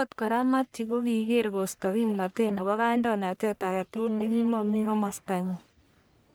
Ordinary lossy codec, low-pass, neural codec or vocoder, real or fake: none; none; codec, 44.1 kHz, 1.7 kbps, Pupu-Codec; fake